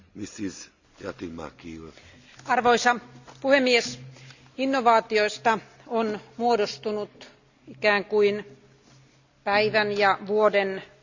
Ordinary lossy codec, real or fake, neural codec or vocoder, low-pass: Opus, 64 kbps; real; none; 7.2 kHz